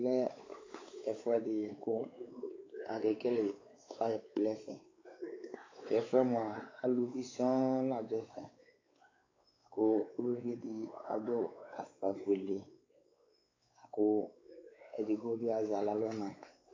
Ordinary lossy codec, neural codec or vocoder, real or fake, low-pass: AAC, 32 kbps; codec, 16 kHz, 4 kbps, X-Codec, WavLM features, trained on Multilingual LibriSpeech; fake; 7.2 kHz